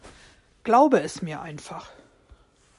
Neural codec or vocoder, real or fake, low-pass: none; real; 10.8 kHz